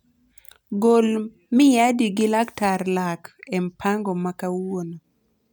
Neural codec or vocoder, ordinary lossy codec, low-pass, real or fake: none; none; none; real